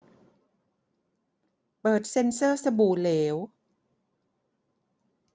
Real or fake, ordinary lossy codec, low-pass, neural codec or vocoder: real; none; none; none